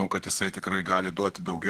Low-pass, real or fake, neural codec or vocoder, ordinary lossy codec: 14.4 kHz; fake; codec, 32 kHz, 1.9 kbps, SNAC; Opus, 16 kbps